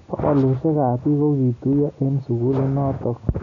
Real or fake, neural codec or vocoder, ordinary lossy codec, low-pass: real; none; none; 7.2 kHz